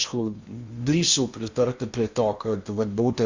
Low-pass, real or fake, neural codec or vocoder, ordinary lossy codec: 7.2 kHz; fake; codec, 16 kHz in and 24 kHz out, 0.8 kbps, FocalCodec, streaming, 65536 codes; Opus, 64 kbps